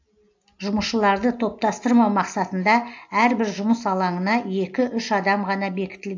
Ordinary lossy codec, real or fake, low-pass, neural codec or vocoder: AAC, 48 kbps; real; 7.2 kHz; none